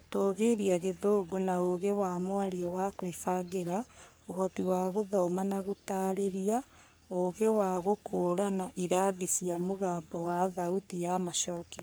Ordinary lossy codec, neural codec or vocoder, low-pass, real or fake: none; codec, 44.1 kHz, 3.4 kbps, Pupu-Codec; none; fake